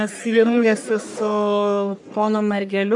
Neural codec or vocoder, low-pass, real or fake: codec, 44.1 kHz, 1.7 kbps, Pupu-Codec; 10.8 kHz; fake